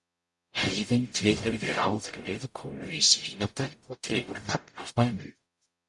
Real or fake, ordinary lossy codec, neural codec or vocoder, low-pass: fake; Opus, 64 kbps; codec, 44.1 kHz, 0.9 kbps, DAC; 10.8 kHz